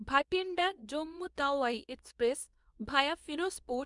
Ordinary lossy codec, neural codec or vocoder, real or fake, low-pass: none; codec, 24 kHz, 0.9 kbps, WavTokenizer, medium speech release version 2; fake; 10.8 kHz